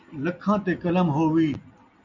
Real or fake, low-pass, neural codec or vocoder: real; 7.2 kHz; none